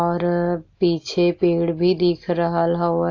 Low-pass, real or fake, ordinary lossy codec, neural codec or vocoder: 7.2 kHz; real; AAC, 48 kbps; none